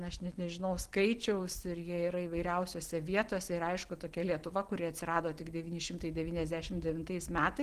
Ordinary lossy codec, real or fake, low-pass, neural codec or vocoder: Opus, 16 kbps; real; 14.4 kHz; none